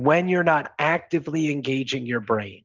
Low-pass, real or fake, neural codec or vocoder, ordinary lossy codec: 7.2 kHz; real; none; Opus, 24 kbps